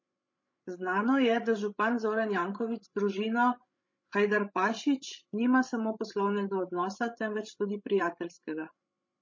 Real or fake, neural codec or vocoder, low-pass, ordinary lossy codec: fake; codec, 16 kHz, 16 kbps, FreqCodec, larger model; 7.2 kHz; MP3, 32 kbps